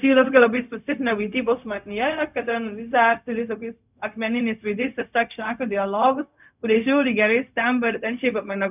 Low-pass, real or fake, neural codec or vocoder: 3.6 kHz; fake; codec, 16 kHz, 0.4 kbps, LongCat-Audio-Codec